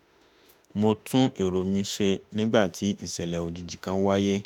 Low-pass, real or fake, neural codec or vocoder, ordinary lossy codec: 19.8 kHz; fake; autoencoder, 48 kHz, 32 numbers a frame, DAC-VAE, trained on Japanese speech; none